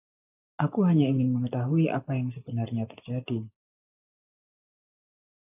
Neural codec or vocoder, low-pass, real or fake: none; 3.6 kHz; real